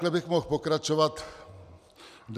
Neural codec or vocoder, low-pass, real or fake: none; 14.4 kHz; real